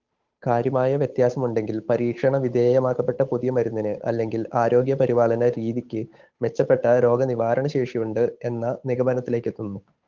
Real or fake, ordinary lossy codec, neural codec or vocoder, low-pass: fake; Opus, 24 kbps; codec, 16 kHz, 8 kbps, FunCodec, trained on Chinese and English, 25 frames a second; 7.2 kHz